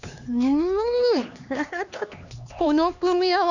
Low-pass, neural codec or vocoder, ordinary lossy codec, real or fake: 7.2 kHz; codec, 16 kHz, 2 kbps, X-Codec, HuBERT features, trained on LibriSpeech; none; fake